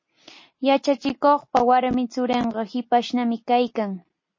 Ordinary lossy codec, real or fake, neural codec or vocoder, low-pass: MP3, 32 kbps; real; none; 7.2 kHz